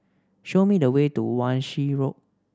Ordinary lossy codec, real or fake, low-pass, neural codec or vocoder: none; real; none; none